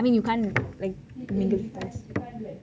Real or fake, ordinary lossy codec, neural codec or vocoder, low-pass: real; none; none; none